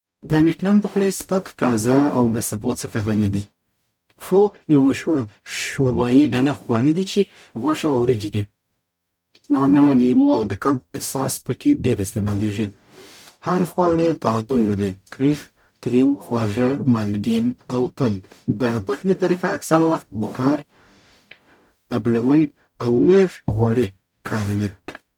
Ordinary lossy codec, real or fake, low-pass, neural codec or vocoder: none; fake; 19.8 kHz; codec, 44.1 kHz, 0.9 kbps, DAC